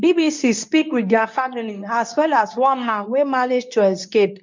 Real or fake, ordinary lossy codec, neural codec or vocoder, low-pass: fake; MP3, 64 kbps; codec, 24 kHz, 0.9 kbps, WavTokenizer, medium speech release version 2; 7.2 kHz